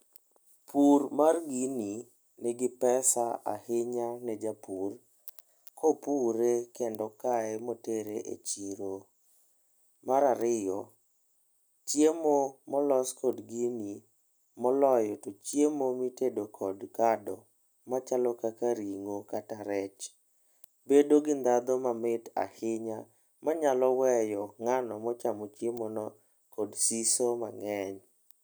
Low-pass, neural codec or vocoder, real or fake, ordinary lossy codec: none; none; real; none